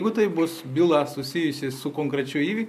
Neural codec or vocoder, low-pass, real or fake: none; 14.4 kHz; real